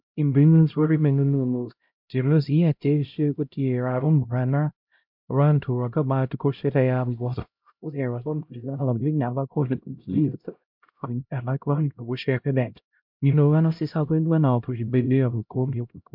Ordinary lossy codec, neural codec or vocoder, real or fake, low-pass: MP3, 48 kbps; codec, 16 kHz, 0.5 kbps, X-Codec, HuBERT features, trained on LibriSpeech; fake; 5.4 kHz